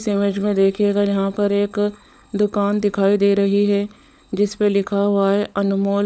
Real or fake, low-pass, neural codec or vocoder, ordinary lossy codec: fake; none; codec, 16 kHz, 16 kbps, FunCodec, trained on LibriTTS, 50 frames a second; none